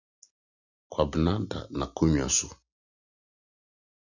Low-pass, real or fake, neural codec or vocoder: 7.2 kHz; real; none